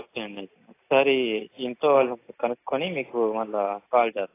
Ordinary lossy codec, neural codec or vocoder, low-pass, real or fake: AAC, 24 kbps; none; 3.6 kHz; real